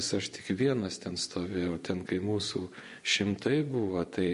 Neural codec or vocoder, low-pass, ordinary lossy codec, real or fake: vocoder, 24 kHz, 100 mel bands, Vocos; 10.8 kHz; MP3, 48 kbps; fake